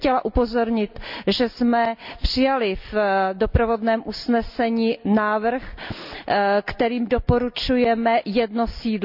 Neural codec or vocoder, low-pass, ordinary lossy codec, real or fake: none; 5.4 kHz; none; real